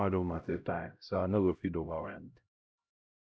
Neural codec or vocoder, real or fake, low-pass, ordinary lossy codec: codec, 16 kHz, 0.5 kbps, X-Codec, HuBERT features, trained on LibriSpeech; fake; none; none